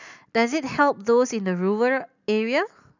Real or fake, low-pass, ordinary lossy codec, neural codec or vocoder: real; 7.2 kHz; none; none